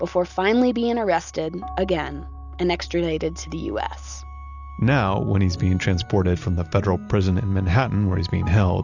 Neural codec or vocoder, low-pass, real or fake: none; 7.2 kHz; real